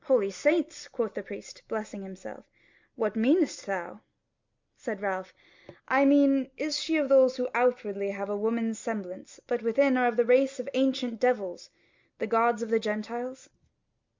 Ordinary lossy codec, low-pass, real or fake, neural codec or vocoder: MP3, 64 kbps; 7.2 kHz; real; none